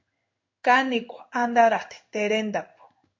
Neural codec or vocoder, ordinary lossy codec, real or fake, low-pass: codec, 16 kHz in and 24 kHz out, 1 kbps, XY-Tokenizer; MP3, 48 kbps; fake; 7.2 kHz